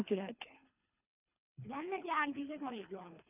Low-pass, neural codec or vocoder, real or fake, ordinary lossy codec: 3.6 kHz; codec, 24 kHz, 3 kbps, HILCodec; fake; none